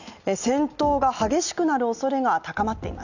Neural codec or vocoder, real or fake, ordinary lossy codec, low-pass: none; real; none; 7.2 kHz